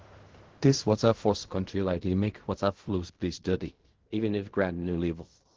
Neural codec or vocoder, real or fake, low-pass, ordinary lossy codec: codec, 16 kHz in and 24 kHz out, 0.4 kbps, LongCat-Audio-Codec, fine tuned four codebook decoder; fake; 7.2 kHz; Opus, 16 kbps